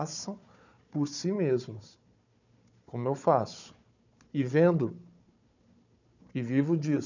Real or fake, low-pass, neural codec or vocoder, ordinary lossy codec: fake; 7.2 kHz; codec, 16 kHz, 4 kbps, FunCodec, trained on Chinese and English, 50 frames a second; none